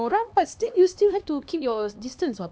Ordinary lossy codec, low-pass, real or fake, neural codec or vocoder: none; none; fake; codec, 16 kHz, 2 kbps, X-Codec, HuBERT features, trained on LibriSpeech